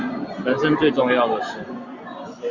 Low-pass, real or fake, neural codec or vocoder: 7.2 kHz; fake; vocoder, 44.1 kHz, 128 mel bands every 256 samples, BigVGAN v2